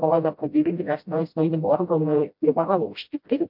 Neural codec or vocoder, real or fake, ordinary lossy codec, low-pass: codec, 16 kHz, 0.5 kbps, FreqCodec, smaller model; fake; MP3, 48 kbps; 5.4 kHz